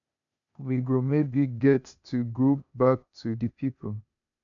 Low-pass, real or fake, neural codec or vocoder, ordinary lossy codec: 7.2 kHz; fake; codec, 16 kHz, 0.8 kbps, ZipCodec; none